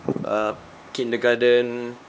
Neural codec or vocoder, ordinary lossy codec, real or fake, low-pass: codec, 16 kHz, 2 kbps, X-Codec, HuBERT features, trained on LibriSpeech; none; fake; none